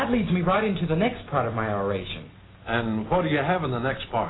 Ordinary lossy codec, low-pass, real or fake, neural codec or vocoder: AAC, 16 kbps; 7.2 kHz; real; none